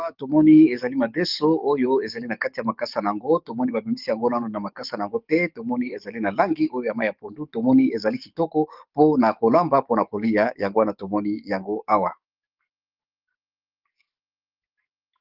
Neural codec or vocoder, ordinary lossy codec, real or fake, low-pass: codec, 44.1 kHz, 7.8 kbps, DAC; Opus, 32 kbps; fake; 5.4 kHz